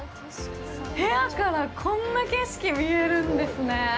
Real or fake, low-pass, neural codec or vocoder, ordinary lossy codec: real; none; none; none